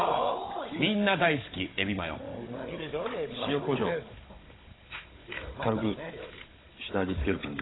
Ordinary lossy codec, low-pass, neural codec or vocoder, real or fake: AAC, 16 kbps; 7.2 kHz; codec, 16 kHz, 16 kbps, FunCodec, trained on Chinese and English, 50 frames a second; fake